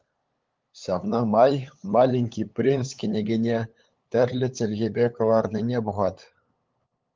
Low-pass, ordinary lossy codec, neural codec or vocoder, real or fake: 7.2 kHz; Opus, 32 kbps; codec, 16 kHz, 8 kbps, FunCodec, trained on LibriTTS, 25 frames a second; fake